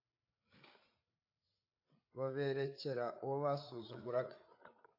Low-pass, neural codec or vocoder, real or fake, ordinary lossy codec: 5.4 kHz; codec, 16 kHz, 8 kbps, FreqCodec, larger model; fake; AAC, 32 kbps